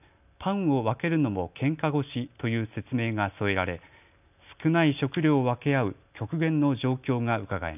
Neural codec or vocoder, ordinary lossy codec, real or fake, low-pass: none; none; real; 3.6 kHz